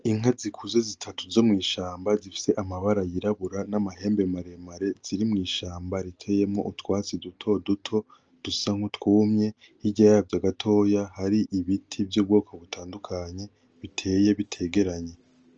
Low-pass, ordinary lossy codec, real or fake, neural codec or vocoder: 7.2 kHz; Opus, 24 kbps; real; none